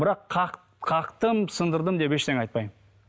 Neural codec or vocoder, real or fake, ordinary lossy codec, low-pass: none; real; none; none